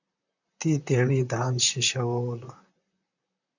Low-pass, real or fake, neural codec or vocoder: 7.2 kHz; fake; vocoder, 44.1 kHz, 128 mel bands, Pupu-Vocoder